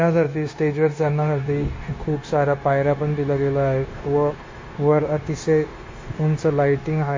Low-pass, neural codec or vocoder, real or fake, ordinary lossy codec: 7.2 kHz; codec, 16 kHz, 0.9 kbps, LongCat-Audio-Codec; fake; MP3, 32 kbps